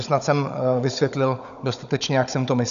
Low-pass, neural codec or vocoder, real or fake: 7.2 kHz; codec, 16 kHz, 16 kbps, FunCodec, trained on Chinese and English, 50 frames a second; fake